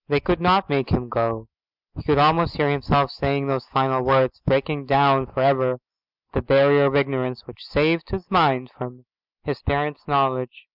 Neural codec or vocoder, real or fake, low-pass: none; real; 5.4 kHz